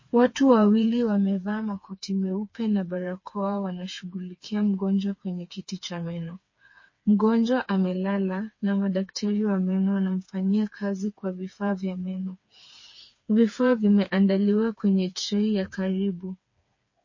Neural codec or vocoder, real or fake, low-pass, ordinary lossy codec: codec, 16 kHz, 4 kbps, FreqCodec, smaller model; fake; 7.2 kHz; MP3, 32 kbps